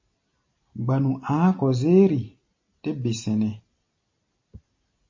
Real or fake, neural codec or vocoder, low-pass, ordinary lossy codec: real; none; 7.2 kHz; MP3, 32 kbps